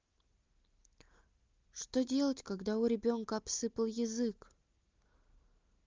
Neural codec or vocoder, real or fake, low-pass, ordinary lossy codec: none; real; 7.2 kHz; Opus, 32 kbps